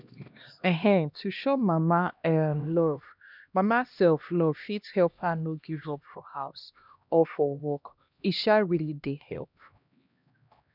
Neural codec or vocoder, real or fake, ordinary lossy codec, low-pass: codec, 16 kHz, 1 kbps, X-Codec, HuBERT features, trained on LibriSpeech; fake; none; 5.4 kHz